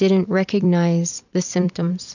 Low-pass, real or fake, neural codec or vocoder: 7.2 kHz; fake; vocoder, 44.1 kHz, 128 mel bands every 256 samples, BigVGAN v2